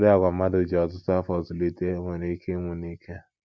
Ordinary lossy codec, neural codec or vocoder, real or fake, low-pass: none; none; real; none